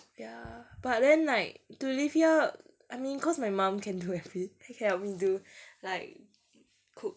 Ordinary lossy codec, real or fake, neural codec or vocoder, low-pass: none; real; none; none